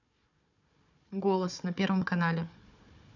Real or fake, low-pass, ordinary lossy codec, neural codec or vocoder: fake; 7.2 kHz; none; codec, 16 kHz, 4 kbps, FunCodec, trained on Chinese and English, 50 frames a second